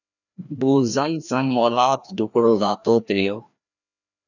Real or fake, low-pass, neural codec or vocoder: fake; 7.2 kHz; codec, 16 kHz, 1 kbps, FreqCodec, larger model